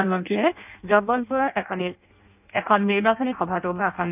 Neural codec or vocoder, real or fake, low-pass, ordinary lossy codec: codec, 16 kHz in and 24 kHz out, 0.6 kbps, FireRedTTS-2 codec; fake; 3.6 kHz; none